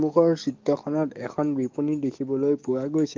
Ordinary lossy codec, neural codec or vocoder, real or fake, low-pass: Opus, 24 kbps; codec, 44.1 kHz, 7.8 kbps, DAC; fake; 7.2 kHz